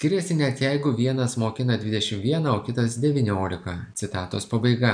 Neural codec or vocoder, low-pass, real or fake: none; 9.9 kHz; real